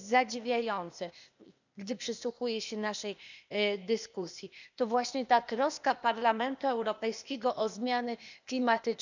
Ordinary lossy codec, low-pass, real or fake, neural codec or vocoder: none; 7.2 kHz; fake; codec, 16 kHz, 0.8 kbps, ZipCodec